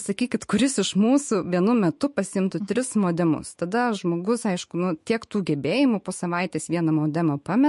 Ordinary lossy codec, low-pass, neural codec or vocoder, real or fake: MP3, 48 kbps; 14.4 kHz; autoencoder, 48 kHz, 128 numbers a frame, DAC-VAE, trained on Japanese speech; fake